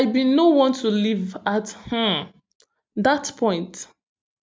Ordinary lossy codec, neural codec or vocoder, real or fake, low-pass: none; none; real; none